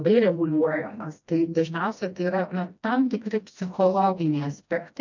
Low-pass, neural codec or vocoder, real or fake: 7.2 kHz; codec, 16 kHz, 1 kbps, FreqCodec, smaller model; fake